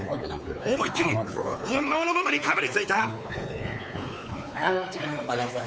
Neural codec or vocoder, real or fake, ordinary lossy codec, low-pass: codec, 16 kHz, 4 kbps, X-Codec, WavLM features, trained on Multilingual LibriSpeech; fake; none; none